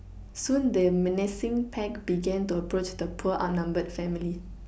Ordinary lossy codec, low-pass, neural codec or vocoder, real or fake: none; none; none; real